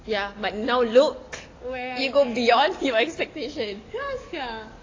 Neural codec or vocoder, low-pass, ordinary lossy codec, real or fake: codec, 44.1 kHz, 7.8 kbps, DAC; 7.2 kHz; AAC, 32 kbps; fake